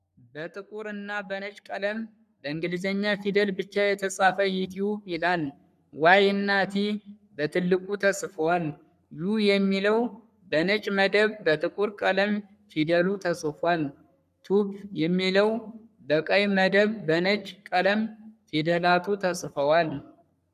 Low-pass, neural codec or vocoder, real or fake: 14.4 kHz; codec, 44.1 kHz, 3.4 kbps, Pupu-Codec; fake